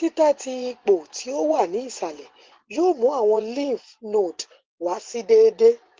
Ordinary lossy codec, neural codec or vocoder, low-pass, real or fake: Opus, 16 kbps; vocoder, 24 kHz, 100 mel bands, Vocos; 7.2 kHz; fake